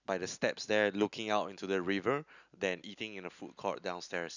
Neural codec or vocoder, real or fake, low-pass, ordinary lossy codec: none; real; 7.2 kHz; none